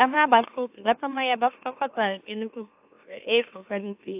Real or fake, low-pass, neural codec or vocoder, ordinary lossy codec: fake; 3.6 kHz; autoencoder, 44.1 kHz, a latent of 192 numbers a frame, MeloTTS; AAC, 32 kbps